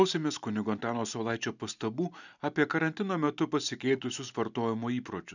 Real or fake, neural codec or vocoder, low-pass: real; none; 7.2 kHz